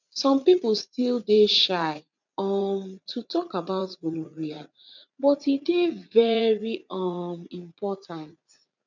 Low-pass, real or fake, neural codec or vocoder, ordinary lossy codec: 7.2 kHz; fake; vocoder, 44.1 kHz, 80 mel bands, Vocos; none